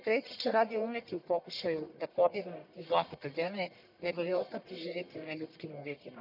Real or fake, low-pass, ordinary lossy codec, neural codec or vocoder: fake; 5.4 kHz; none; codec, 44.1 kHz, 1.7 kbps, Pupu-Codec